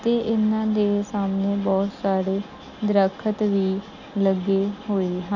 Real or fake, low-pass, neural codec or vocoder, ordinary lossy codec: real; 7.2 kHz; none; none